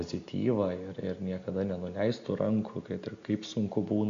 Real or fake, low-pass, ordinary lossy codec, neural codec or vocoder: real; 7.2 kHz; AAC, 48 kbps; none